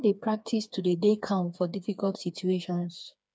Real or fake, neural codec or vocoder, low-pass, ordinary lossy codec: fake; codec, 16 kHz, 4 kbps, FreqCodec, smaller model; none; none